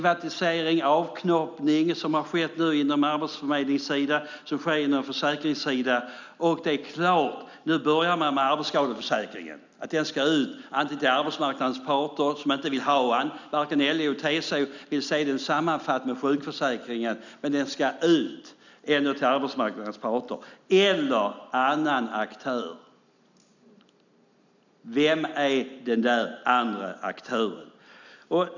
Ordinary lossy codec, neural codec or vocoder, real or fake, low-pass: none; none; real; 7.2 kHz